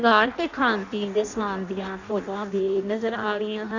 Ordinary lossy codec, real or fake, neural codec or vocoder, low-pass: Opus, 64 kbps; fake; codec, 16 kHz in and 24 kHz out, 0.6 kbps, FireRedTTS-2 codec; 7.2 kHz